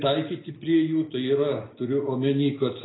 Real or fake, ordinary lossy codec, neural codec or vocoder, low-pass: real; AAC, 16 kbps; none; 7.2 kHz